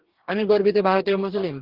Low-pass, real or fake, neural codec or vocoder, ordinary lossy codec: 5.4 kHz; fake; codec, 44.1 kHz, 2.6 kbps, DAC; Opus, 16 kbps